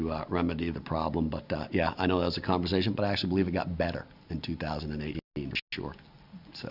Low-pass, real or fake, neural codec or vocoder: 5.4 kHz; real; none